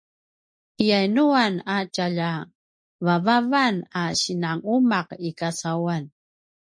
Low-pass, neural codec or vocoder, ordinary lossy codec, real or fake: 9.9 kHz; none; MP3, 48 kbps; real